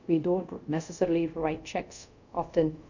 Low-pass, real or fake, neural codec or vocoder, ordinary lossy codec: 7.2 kHz; fake; codec, 24 kHz, 0.5 kbps, DualCodec; none